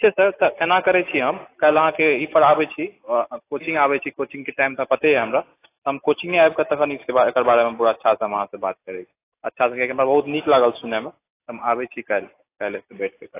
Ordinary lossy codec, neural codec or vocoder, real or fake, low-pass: AAC, 24 kbps; none; real; 3.6 kHz